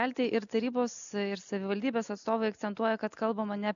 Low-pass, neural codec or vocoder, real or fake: 7.2 kHz; none; real